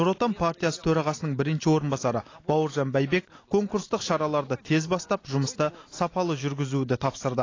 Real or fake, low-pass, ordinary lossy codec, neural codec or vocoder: real; 7.2 kHz; AAC, 32 kbps; none